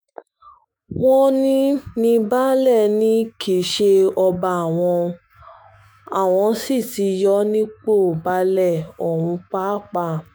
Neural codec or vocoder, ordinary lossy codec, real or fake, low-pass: autoencoder, 48 kHz, 128 numbers a frame, DAC-VAE, trained on Japanese speech; none; fake; none